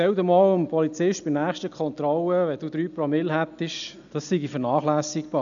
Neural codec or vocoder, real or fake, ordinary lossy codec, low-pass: none; real; none; 7.2 kHz